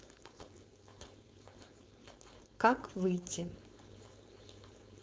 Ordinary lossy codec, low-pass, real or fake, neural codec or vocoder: none; none; fake; codec, 16 kHz, 4.8 kbps, FACodec